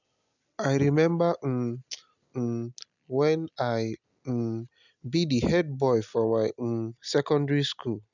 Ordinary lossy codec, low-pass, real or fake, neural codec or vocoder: none; 7.2 kHz; real; none